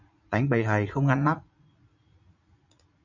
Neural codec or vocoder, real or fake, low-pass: vocoder, 44.1 kHz, 80 mel bands, Vocos; fake; 7.2 kHz